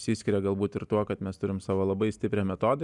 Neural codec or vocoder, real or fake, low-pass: none; real; 10.8 kHz